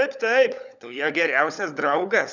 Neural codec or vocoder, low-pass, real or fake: codec, 16 kHz, 16 kbps, FunCodec, trained on LibriTTS, 50 frames a second; 7.2 kHz; fake